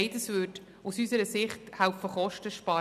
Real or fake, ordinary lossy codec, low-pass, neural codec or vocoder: real; none; 14.4 kHz; none